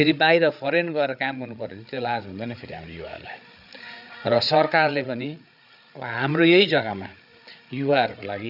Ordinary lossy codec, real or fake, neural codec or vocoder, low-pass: none; fake; codec, 16 kHz, 8 kbps, FreqCodec, larger model; 5.4 kHz